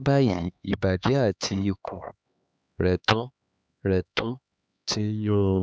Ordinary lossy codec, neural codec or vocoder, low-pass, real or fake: none; codec, 16 kHz, 2 kbps, X-Codec, HuBERT features, trained on LibriSpeech; none; fake